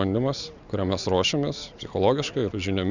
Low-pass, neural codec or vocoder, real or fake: 7.2 kHz; none; real